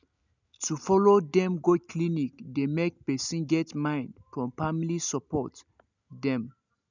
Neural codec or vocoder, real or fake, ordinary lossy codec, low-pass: none; real; none; 7.2 kHz